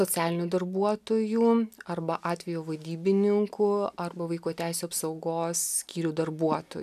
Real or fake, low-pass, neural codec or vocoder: real; 14.4 kHz; none